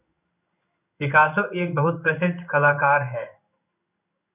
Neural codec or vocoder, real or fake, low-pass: codec, 16 kHz in and 24 kHz out, 1 kbps, XY-Tokenizer; fake; 3.6 kHz